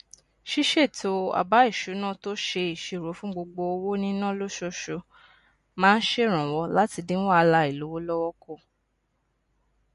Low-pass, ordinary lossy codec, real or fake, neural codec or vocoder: 14.4 kHz; MP3, 48 kbps; real; none